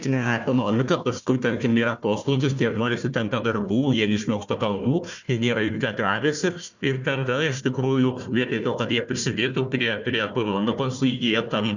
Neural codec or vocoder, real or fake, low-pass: codec, 16 kHz, 1 kbps, FunCodec, trained on Chinese and English, 50 frames a second; fake; 7.2 kHz